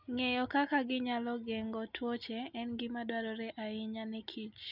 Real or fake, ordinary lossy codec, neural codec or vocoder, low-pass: real; none; none; 5.4 kHz